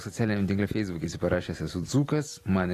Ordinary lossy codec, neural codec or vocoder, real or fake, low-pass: AAC, 48 kbps; none; real; 14.4 kHz